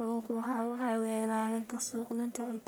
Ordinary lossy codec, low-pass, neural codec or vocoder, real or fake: none; none; codec, 44.1 kHz, 1.7 kbps, Pupu-Codec; fake